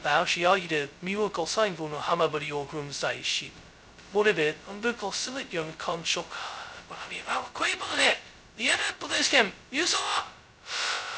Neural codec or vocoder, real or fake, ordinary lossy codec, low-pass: codec, 16 kHz, 0.2 kbps, FocalCodec; fake; none; none